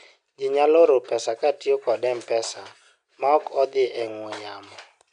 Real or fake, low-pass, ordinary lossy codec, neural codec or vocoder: real; 9.9 kHz; none; none